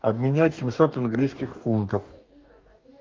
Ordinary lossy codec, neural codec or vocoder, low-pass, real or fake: Opus, 24 kbps; codec, 44.1 kHz, 2.6 kbps, DAC; 7.2 kHz; fake